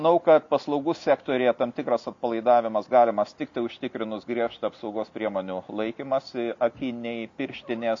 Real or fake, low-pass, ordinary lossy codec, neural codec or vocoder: real; 7.2 kHz; MP3, 48 kbps; none